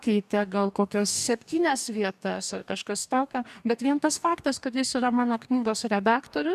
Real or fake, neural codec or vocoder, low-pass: fake; codec, 44.1 kHz, 2.6 kbps, DAC; 14.4 kHz